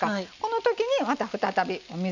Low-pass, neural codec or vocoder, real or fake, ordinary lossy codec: 7.2 kHz; none; real; none